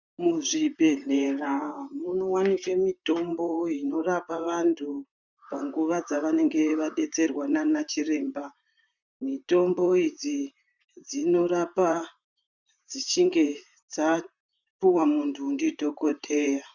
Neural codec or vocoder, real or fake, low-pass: vocoder, 44.1 kHz, 128 mel bands, Pupu-Vocoder; fake; 7.2 kHz